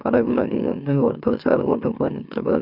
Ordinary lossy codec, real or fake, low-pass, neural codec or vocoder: none; fake; 5.4 kHz; autoencoder, 44.1 kHz, a latent of 192 numbers a frame, MeloTTS